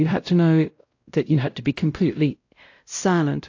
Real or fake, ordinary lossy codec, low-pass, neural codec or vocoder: fake; AAC, 48 kbps; 7.2 kHz; codec, 16 kHz, 0.5 kbps, X-Codec, WavLM features, trained on Multilingual LibriSpeech